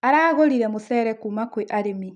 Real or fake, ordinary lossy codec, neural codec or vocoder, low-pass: real; none; none; 9.9 kHz